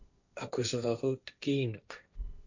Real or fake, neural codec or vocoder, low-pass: fake; codec, 16 kHz, 1.1 kbps, Voila-Tokenizer; 7.2 kHz